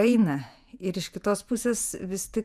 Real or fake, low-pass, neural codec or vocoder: fake; 14.4 kHz; vocoder, 48 kHz, 128 mel bands, Vocos